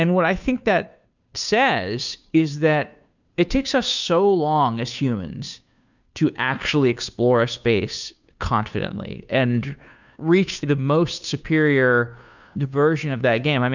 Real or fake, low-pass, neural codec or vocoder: fake; 7.2 kHz; codec, 16 kHz, 2 kbps, FunCodec, trained on Chinese and English, 25 frames a second